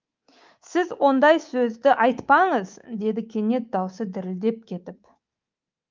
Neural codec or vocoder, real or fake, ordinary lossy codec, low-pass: codec, 24 kHz, 3.1 kbps, DualCodec; fake; Opus, 24 kbps; 7.2 kHz